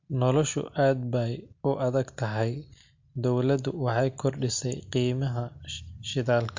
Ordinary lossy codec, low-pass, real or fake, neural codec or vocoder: MP3, 48 kbps; 7.2 kHz; real; none